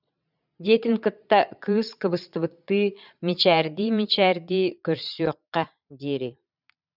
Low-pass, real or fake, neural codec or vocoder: 5.4 kHz; fake; vocoder, 22.05 kHz, 80 mel bands, Vocos